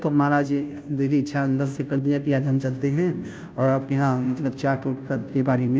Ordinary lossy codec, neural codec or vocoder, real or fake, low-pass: none; codec, 16 kHz, 0.5 kbps, FunCodec, trained on Chinese and English, 25 frames a second; fake; none